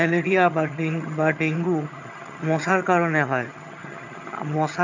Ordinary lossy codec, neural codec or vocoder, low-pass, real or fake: none; vocoder, 22.05 kHz, 80 mel bands, HiFi-GAN; 7.2 kHz; fake